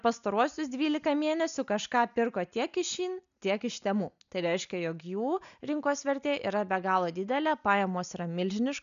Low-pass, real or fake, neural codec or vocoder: 7.2 kHz; real; none